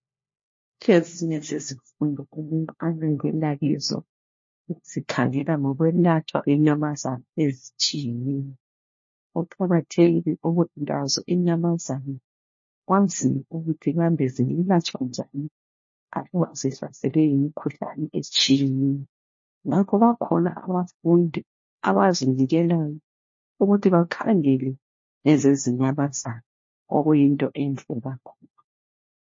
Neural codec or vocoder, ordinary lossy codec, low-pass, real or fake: codec, 16 kHz, 1 kbps, FunCodec, trained on LibriTTS, 50 frames a second; MP3, 32 kbps; 7.2 kHz; fake